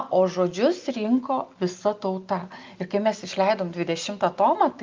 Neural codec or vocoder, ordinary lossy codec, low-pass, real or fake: none; Opus, 24 kbps; 7.2 kHz; real